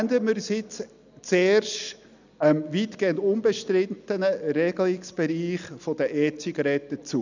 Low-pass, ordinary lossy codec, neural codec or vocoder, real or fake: 7.2 kHz; none; none; real